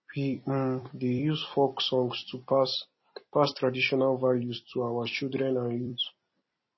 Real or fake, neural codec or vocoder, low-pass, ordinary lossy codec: fake; vocoder, 44.1 kHz, 128 mel bands every 256 samples, BigVGAN v2; 7.2 kHz; MP3, 24 kbps